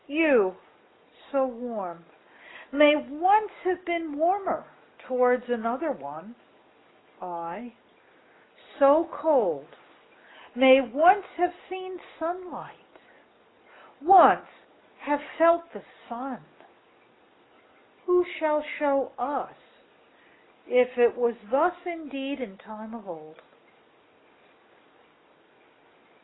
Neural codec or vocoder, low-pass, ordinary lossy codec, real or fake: none; 7.2 kHz; AAC, 16 kbps; real